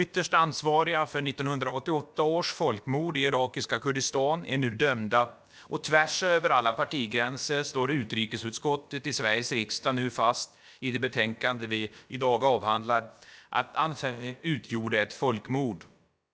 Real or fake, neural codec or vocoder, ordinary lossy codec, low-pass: fake; codec, 16 kHz, about 1 kbps, DyCAST, with the encoder's durations; none; none